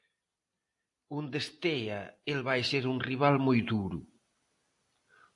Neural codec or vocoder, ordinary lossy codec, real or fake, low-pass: none; AAC, 64 kbps; real; 10.8 kHz